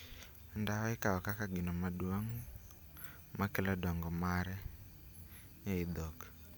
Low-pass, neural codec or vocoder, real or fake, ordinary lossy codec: none; none; real; none